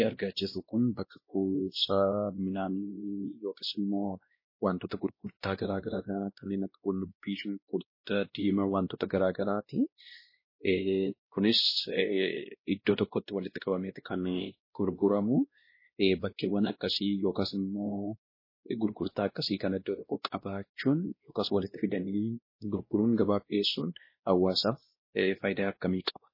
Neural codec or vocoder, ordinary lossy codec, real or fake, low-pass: codec, 16 kHz, 1 kbps, X-Codec, WavLM features, trained on Multilingual LibriSpeech; MP3, 24 kbps; fake; 5.4 kHz